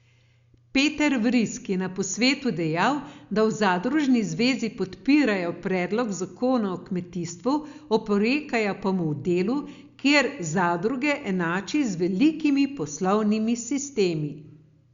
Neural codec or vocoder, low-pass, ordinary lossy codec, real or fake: none; 7.2 kHz; Opus, 64 kbps; real